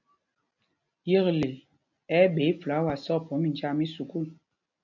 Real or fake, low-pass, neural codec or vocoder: real; 7.2 kHz; none